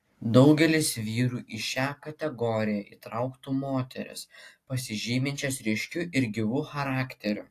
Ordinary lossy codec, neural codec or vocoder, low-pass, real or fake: AAC, 64 kbps; none; 14.4 kHz; real